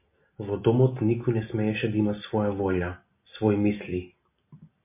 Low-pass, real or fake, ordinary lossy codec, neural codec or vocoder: 3.6 kHz; real; MP3, 24 kbps; none